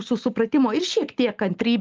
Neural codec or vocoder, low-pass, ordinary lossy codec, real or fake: none; 7.2 kHz; Opus, 24 kbps; real